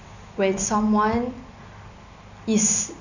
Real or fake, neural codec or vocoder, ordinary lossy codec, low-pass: real; none; none; 7.2 kHz